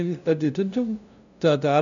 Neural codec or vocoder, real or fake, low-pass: codec, 16 kHz, 0.5 kbps, FunCodec, trained on LibriTTS, 25 frames a second; fake; 7.2 kHz